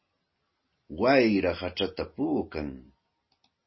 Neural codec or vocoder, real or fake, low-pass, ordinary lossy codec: none; real; 7.2 kHz; MP3, 24 kbps